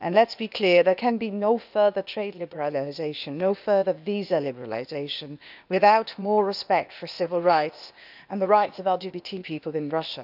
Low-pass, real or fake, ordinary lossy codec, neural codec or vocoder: 5.4 kHz; fake; none; codec, 16 kHz, 0.8 kbps, ZipCodec